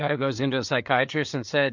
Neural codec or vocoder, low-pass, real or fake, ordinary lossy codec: codec, 16 kHz, 4 kbps, FreqCodec, larger model; 7.2 kHz; fake; MP3, 64 kbps